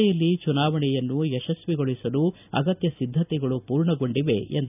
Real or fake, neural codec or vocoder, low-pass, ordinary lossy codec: real; none; 3.6 kHz; none